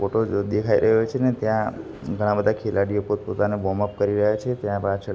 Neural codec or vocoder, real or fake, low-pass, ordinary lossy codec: none; real; none; none